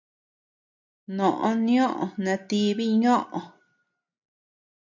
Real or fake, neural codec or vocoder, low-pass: real; none; 7.2 kHz